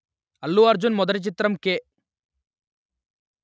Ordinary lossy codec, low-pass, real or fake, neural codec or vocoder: none; none; real; none